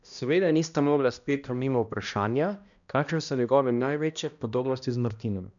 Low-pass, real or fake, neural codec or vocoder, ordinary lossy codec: 7.2 kHz; fake; codec, 16 kHz, 1 kbps, X-Codec, HuBERT features, trained on balanced general audio; none